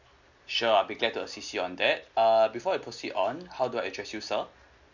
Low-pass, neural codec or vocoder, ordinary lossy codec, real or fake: 7.2 kHz; none; Opus, 64 kbps; real